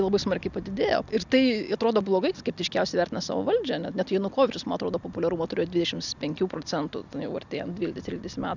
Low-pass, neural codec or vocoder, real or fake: 7.2 kHz; none; real